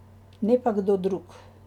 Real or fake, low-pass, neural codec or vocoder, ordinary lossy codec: real; 19.8 kHz; none; none